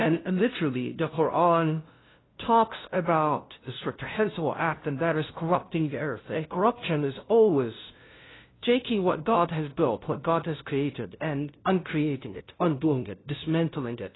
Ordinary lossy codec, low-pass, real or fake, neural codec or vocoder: AAC, 16 kbps; 7.2 kHz; fake; codec, 16 kHz, 0.5 kbps, FunCodec, trained on LibriTTS, 25 frames a second